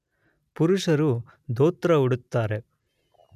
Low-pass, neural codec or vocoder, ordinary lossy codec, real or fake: 14.4 kHz; none; none; real